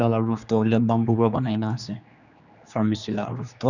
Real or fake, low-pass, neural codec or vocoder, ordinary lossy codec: fake; 7.2 kHz; codec, 16 kHz, 2 kbps, X-Codec, HuBERT features, trained on general audio; none